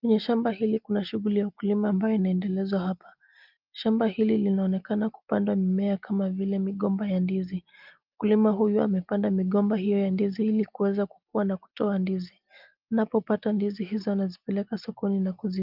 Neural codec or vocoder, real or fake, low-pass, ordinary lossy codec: none; real; 5.4 kHz; Opus, 24 kbps